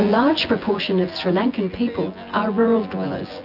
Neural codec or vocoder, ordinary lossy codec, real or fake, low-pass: vocoder, 24 kHz, 100 mel bands, Vocos; AAC, 48 kbps; fake; 5.4 kHz